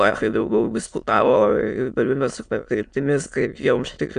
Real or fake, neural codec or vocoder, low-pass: fake; autoencoder, 22.05 kHz, a latent of 192 numbers a frame, VITS, trained on many speakers; 9.9 kHz